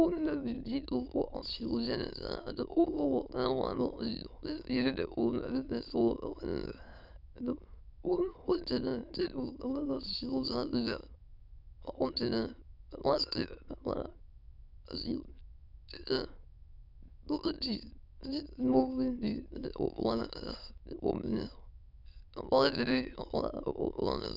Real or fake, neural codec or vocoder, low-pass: fake; autoencoder, 22.05 kHz, a latent of 192 numbers a frame, VITS, trained on many speakers; 5.4 kHz